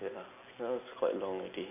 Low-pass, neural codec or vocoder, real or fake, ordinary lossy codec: 3.6 kHz; none; real; none